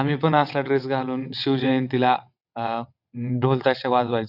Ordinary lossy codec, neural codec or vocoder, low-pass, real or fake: none; vocoder, 22.05 kHz, 80 mel bands, WaveNeXt; 5.4 kHz; fake